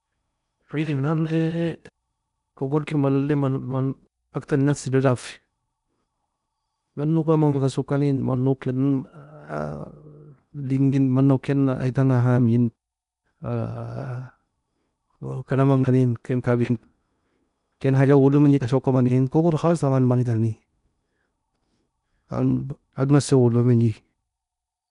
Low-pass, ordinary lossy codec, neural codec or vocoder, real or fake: 10.8 kHz; none; codec, 16 kHz in and 24 kHz out, 0.8 kbps, FocalCodec, streaming, 65536 codes; fake